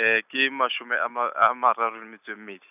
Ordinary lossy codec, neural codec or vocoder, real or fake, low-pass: none; none; real; 3.6 kHz